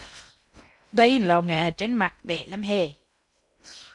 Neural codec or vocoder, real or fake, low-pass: codec, 16 kHz in and 24 kHz out, 0.6 kbps, FocalCodec, streaming, 2048 codes; fake; 10.8 kHz